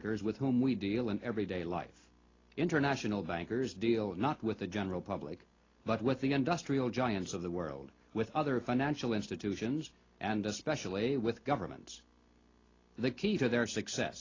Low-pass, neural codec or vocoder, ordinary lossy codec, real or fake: 7.2 kHz; none; AAC, 32 kbps; real